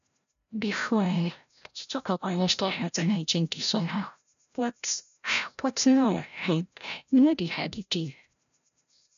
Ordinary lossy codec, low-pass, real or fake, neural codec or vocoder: none; 7.2 kHz; fake; codec, 16 kHz, 0.5 kbps, FreqCodec, larger model